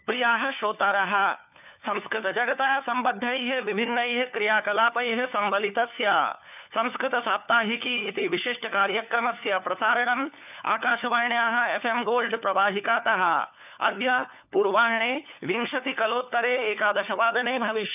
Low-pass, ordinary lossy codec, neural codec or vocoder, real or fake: 3.6 kHz; none; codec, 16 kHz, 4 kbps, FunCodec, trained on LibriTTS, 50 frames a second; fake